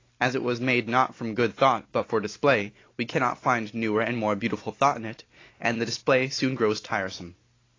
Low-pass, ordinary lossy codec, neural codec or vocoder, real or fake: 7.2 kHz; AAC, 32 kbps; none; real